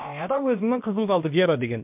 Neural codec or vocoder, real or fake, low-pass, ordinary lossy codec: codec, 16 kHz, about 1 kbps, DyCAST, with the encoder's durations; fake; 3.6 kHz; MP3, 32 kbps